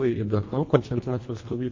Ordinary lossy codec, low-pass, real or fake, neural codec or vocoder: MP3, 32 kbps; 7.2 kHz; fake; codec, 24 kHz, 1.5 kbps, HILCodec